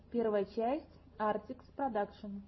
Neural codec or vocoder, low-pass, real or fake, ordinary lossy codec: none; 7.2 kHz; real; MP3, 24 kbps